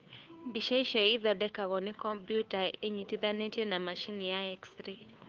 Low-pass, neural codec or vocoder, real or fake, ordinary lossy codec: 7.2 kHz; codec, 16 kHz, 2 kbps, FunCodec, trained on Chinese and English, 25 frames a second; fake; Opus, 24 kbps